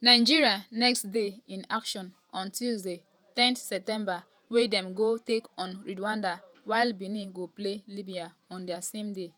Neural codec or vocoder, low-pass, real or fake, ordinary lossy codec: vocoder, 48 kHz, 128 mel bands, Vocos; none; fake; none